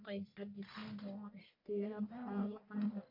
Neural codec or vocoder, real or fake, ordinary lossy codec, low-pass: codec, 44.1 kHz, 1.7 kbps, Pupu-Codec; fake; MP3, 48 kbps; 5.4 kHz